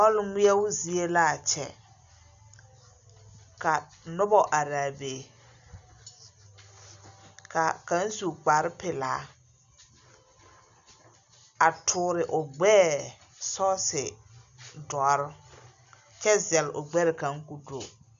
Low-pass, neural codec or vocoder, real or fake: 7.2 kHz; none; real